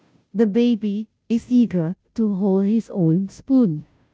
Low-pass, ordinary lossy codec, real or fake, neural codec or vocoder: none; none; fake; codec, 16 kHz, 0.5 kbps, FunCodec, trained on Chinese and English, 25 frames a second